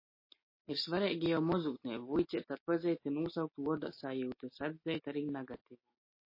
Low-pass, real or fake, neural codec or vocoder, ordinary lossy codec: 5.4 kHz; real; none; MP3, 24 kbps